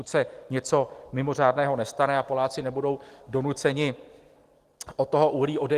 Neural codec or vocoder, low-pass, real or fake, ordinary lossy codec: none; 14.4 kHz; real; Opus, 24 kbps